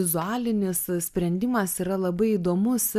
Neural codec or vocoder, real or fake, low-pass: none; real; 14.4 kHz